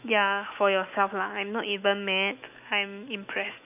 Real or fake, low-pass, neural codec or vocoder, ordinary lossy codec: fake; 3.6 kHz; autoencoder, 48 kHz, 128 numbers a frame, DAC-VAE, trained on Japanese speech; none